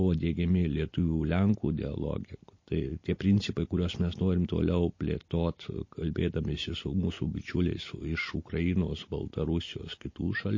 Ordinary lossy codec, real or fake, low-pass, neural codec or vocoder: MP3, 32 kbps; real; 7.2 kHz; none